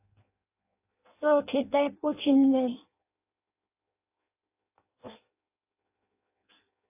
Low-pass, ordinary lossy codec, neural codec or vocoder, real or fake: 3.6 kHz; AAC, 24 kbps; codec, 16 kHz in and 24 kHz out, 0.6 kbps, FireRedTTS-2 codec; fake